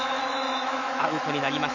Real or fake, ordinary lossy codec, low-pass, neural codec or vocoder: fake; none; 7.2 kHz; autoencoder, 48 kHz, 128 numbers a frame, DAC-VAE, trained on Japanese speech